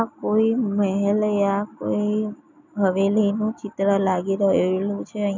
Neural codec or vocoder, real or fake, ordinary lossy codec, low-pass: none; real; none; 7.2 kHz